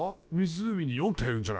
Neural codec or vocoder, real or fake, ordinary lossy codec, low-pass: codec, 16 kHz, about 1 kbps, DyCAST, with the encoder's durations; fake; none; none